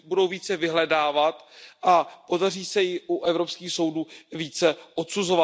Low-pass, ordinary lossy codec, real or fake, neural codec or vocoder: none; none; real; none